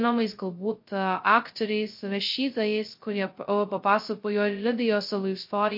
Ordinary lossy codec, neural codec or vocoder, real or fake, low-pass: MP3, 32 kbps; codec, 16 kHz, 0.2 kbps, FocalCodec; fake; 5.4 kHz